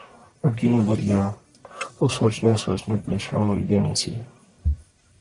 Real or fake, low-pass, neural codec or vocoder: fake; 10.8 kHz; codec, 44.1 kHz, 1.7 kbps, Pupu-Codec